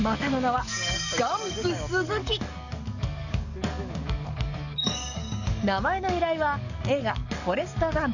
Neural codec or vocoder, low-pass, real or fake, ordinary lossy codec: codec, 44.1 kHz, 7.8 kbps, DAC; 7.2 kHz; fake; none